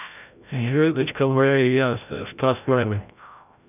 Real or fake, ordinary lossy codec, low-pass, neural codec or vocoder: fake; none; 3.6 kHz; codec, 16 kHz, 0.5 kbps, FreqCodec, larger model